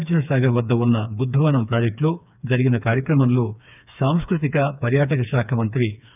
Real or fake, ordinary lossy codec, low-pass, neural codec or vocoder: fake; none; 3.6 kHz; codec, 16 kHz, 4 kbps, FreqCodec, smaller model